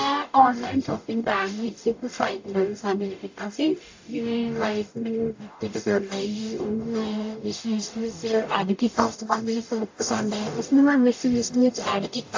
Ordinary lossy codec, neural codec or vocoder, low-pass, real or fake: AAC, 48 kbps; codec, 44.1 kHz, 0.9 kbps, DAC; 7.2 kHz; fake